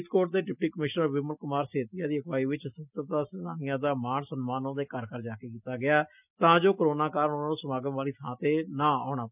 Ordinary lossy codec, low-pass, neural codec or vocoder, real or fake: none; 3.6 kHz; none; real